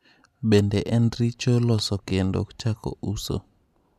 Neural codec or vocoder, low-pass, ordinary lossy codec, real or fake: none; 14.4 kHz; none; real